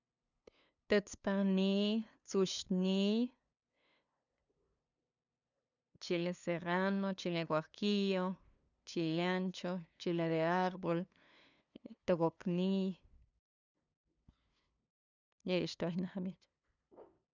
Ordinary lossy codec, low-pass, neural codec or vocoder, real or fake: none; 7.2 kHz; codec, 16 kHz, 2 kbps, FunCodec, trained on LibriTTS, 25 frames a second; fake